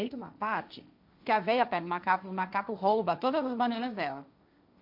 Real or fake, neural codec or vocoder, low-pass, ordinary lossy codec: fake; codec, 16 kHz, 1.1 kbps, Voila-Tokenizer; 5.4 kHz; MP3, 48 kbps